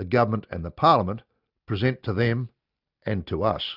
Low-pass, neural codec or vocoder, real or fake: 5.4 kHz; none; real